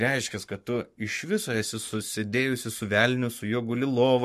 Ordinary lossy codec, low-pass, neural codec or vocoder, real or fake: MP3, 64 kbps; 14.4 kHz; codec, 44.1 kHz, 7.8 kbps, Pupu-Codec; fake